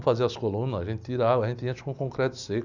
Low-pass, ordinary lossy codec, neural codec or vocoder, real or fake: 7.2 kHz; none; none; real